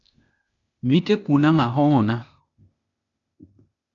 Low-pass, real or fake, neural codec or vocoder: 7.2 kHz; fake; codec, 16 kHz, 0.8 kbps, ZipCodec